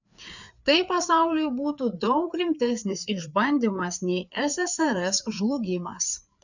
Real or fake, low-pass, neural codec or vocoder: fake; 7.2 kHz; codec, 16 kHz, 4 kbps, FreqCodec, larger model